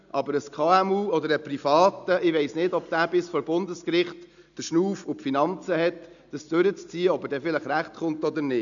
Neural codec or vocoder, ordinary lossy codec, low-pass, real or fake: none; none; 7.2 kHz; real